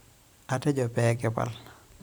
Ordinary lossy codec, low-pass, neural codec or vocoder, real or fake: none; none; vocoder, 44.1 kHz, 128 mel bands every 512 samples, BigVGAN v2; fake